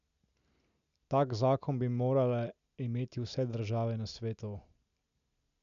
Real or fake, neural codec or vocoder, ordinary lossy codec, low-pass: real; none; none; 7.2 kHz